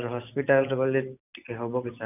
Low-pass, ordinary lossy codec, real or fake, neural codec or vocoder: 3.6 kHz; none; fake; vocoder, 44.1 kHz, 128 mel bands every 512 samples, BigVGAN v2